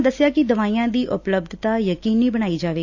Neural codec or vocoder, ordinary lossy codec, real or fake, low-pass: none; AAC, 48 kbps; real; 7.2 kHz